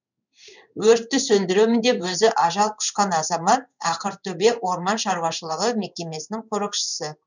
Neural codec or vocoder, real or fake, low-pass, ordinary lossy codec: codec, 16 kHz in and 24 kHz out, 1 kbps, XY-Tokenizer; fake; 7.2 kHz; none